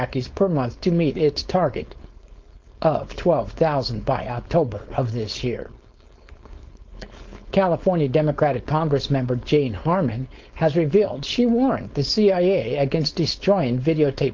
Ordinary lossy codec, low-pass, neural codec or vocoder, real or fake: Opus, 24 kbps; 7.2 kHz; codec, 16 kHz, 4.8 kbps, FACodec; fake